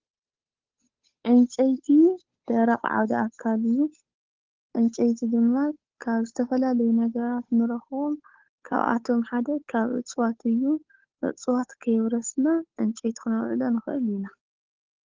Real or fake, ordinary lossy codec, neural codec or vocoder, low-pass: fake; Opus, 16 kbps; codec, 16 kHz, 8 kbps, FunCodec, trained on Chinese and English, 25 frames a second; 7.2 kHz